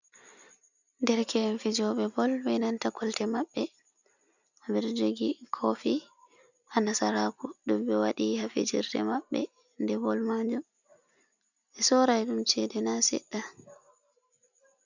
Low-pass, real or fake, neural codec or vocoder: 7.2 kHz; real; none